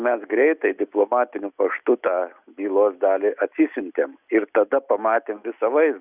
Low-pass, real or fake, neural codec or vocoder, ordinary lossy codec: 3.6 kHz; real; none; Opus, 64 kbps